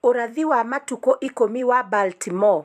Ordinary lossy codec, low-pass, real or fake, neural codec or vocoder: AAC, 96 kbps; 14.4 kHz; fake; vocoder, 44.1 kHz, 128 mel bands every 256 samples, BigVGAN v2